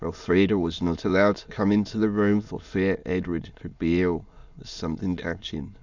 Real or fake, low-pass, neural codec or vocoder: fake; 7.2 kHz; autoencoder, 22.05 kHz, a latent of 192 numbers a frame, VITS, trained on many speakers